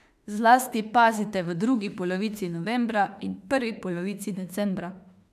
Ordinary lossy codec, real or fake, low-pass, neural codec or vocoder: none; fake; 14.4 kHz; autoencoder, 48 kHz, 32 numbers a frame, DAC-VAE, trained on Japanese speech